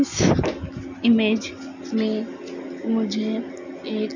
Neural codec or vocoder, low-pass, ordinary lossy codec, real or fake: none; 7.2 kHz; none; real